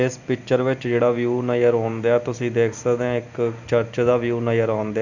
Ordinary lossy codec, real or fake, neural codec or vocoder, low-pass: none; real; none; 7.2 kHz